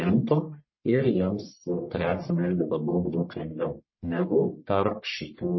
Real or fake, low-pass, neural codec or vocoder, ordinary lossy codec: fake; 7.2 kHz; codec, 44.1 kHz, 1.7 kbps, Pupu-Codec; MP3, 24 kbps